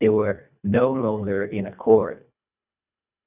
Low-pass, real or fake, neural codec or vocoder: 3.6 kHz; fake; codec, 24 kHz, 1.5 kbps, HILCodec